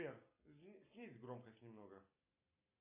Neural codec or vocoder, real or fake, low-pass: none; real; 3.6 kHz